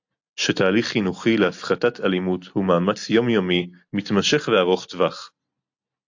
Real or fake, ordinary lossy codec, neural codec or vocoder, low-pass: real; AAC, 48 kbps; none; 7.2 kHz